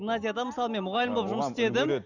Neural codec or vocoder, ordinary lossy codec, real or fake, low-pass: none; none; real; 7.2 kHz